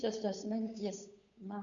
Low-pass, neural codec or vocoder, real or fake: 7.2 kHz; codec, 16 kHz, 8 kbps, FunCodec, trained on Chinese and English, 25 frames a second; fake